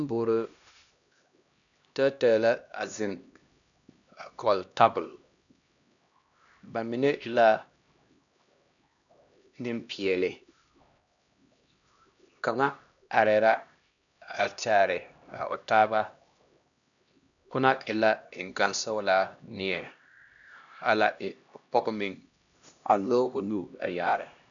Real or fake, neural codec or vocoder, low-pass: fake; codec, 16 kHz, 1 kbps, X-Codec, HuBERT features, trained on LibriSpeech; 7.2 kHz